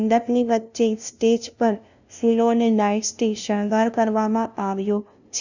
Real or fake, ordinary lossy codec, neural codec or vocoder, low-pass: fake; none; codec, 16 kHz, 0.5 kbps, FunCodec, trained on LibriTTS, 25 frames a second; 7.2 kHz